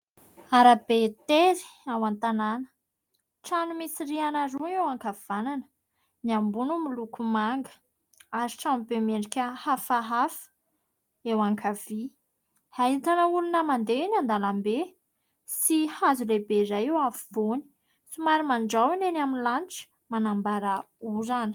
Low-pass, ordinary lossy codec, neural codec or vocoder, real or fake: 19.8 kHz; Opus, 32 kbps; none; real